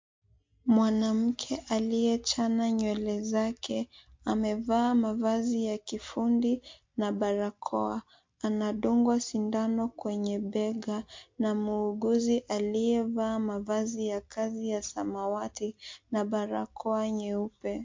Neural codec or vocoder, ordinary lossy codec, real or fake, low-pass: none; MP3, 48 kbps; real; 7.2 kHz